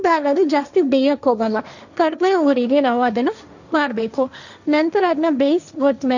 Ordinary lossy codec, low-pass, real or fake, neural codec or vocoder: none; 7.2 kHz; fake; codec, 16 kHz, 1.1 kbps, Voila-Tokenizer